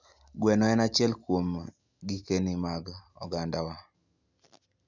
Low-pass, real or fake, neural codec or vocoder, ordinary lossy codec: 7.2 kHz; real; none; none